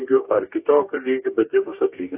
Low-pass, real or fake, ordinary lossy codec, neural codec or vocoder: 3.6 kHz; fake; AAC, 24 kbps; codec, 44.1 kHz, 2.6 kbps, DAC